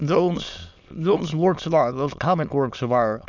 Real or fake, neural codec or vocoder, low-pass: fake; autoencoder, 22.05 kHz, a latent of 192 numbers a frame, VITS, trained on many speakers; 7.2 kHz